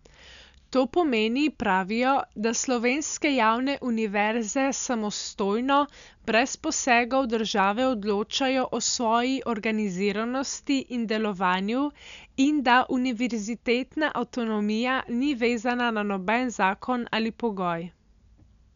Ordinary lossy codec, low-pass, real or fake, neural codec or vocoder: none; 7.2 kHz; real; none